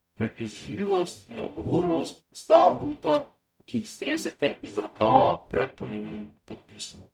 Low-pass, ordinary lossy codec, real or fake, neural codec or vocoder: 19.8 kHz; none; fake; codec, 44.1 kHz, 0.9 kbps, DAC